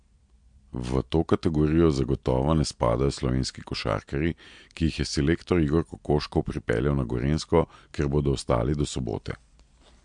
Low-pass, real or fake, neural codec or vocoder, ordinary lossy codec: 9.9 kHz; real; none; MP3, 64 kbps